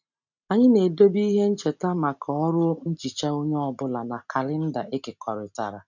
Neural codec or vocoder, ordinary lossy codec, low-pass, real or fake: none; none; 7.2 kHz; real